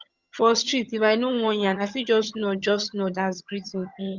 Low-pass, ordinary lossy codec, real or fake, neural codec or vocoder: 7.2 kHz; Opus, 64 kbps; fake; vocoder, 22.05 kHz, 80 mel bands, HiFi-GAN